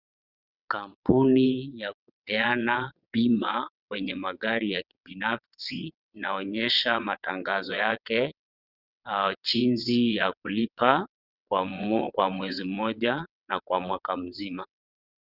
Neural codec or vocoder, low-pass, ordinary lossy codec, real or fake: vocoder, 22.05 kHz, 80 mel bands, WaveNeXt; 5.4 kHz; Opus, 64 kbps; fake